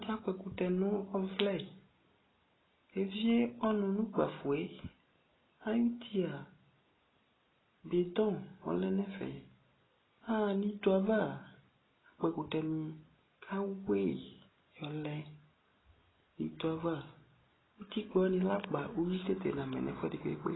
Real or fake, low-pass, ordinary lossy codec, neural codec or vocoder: real; 7.2 kHz; AAC, 16 kbps; none